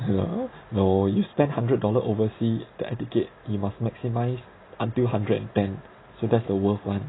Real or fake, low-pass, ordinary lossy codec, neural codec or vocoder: real; 7.2 kHz; AAC, 16 kbps; none